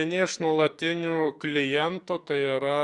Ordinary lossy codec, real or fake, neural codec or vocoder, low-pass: Opus, 64 kbps; fake; codec, 44.1 kHz, 2.6 kbps, SNAC; 10.8 kHz